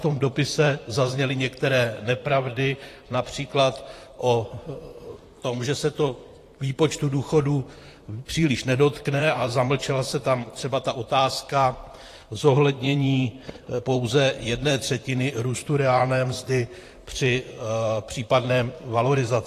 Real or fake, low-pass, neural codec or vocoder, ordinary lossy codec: fake; 14.4 kHz; vocoder, 44.1 kHz, 128 mel bands, Pupu-Vocoder; AAC, 48 kbps